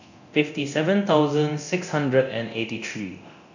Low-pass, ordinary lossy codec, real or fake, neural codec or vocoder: 7.2 kHz; none; fake; codec, 24 kHz, 0.9 kbps, DualCodec